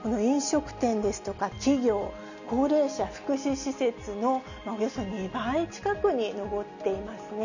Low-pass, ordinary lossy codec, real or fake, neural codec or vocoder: 7.2 kHz; none; real; none